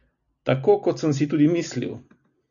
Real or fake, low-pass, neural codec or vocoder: real; 7.2 kHz; none